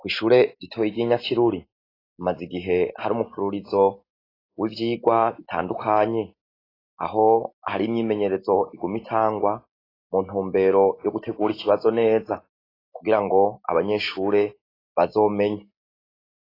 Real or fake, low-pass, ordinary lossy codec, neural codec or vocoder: real; 5.4 kHz; AAC, 24 kbps; none